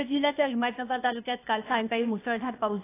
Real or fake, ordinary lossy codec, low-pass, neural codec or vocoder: fake; AAC, 24 kbps; 3.6 kHz; codec, 16 kHz, 0.8 kbps, ZipCodec